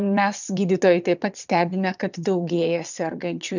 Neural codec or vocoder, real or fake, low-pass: vocoder, 22.05 kHz, 80 mel bands, WaveNeXt; fake; 7.2 kHz